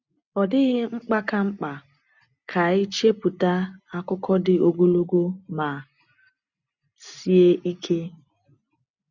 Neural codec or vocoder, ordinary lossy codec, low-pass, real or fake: none; none; 7.2 kHz; real